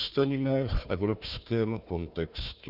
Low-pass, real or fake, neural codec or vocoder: 5.4 kHz; fake; codec, 16 kHz, 1 kbps, FunCodec, trained on Chinese and English, 50 frames a second